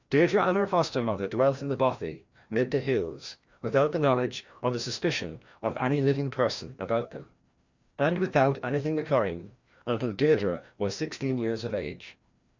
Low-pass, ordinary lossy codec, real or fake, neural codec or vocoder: 7.2 kHz; Opus, 64 kbps; fake; codec, 16 kHz, 1 kbps, FreqCodec, larger model